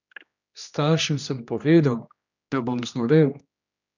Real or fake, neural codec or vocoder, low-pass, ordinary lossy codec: fake; codec, 16 kHz, 1 kbps, X-Codec, HuBERT features, trained on general audio; 7.2 kHz; none